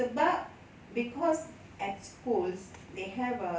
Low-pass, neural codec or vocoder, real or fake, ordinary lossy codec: none; none; real; none